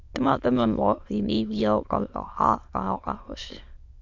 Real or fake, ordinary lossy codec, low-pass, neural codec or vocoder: fake; AAC, 48 kbps; 7.2 kHz; autoencoder, 22.05 kHz, a latent of 192 numbers a frame, VITS, trained on many speakers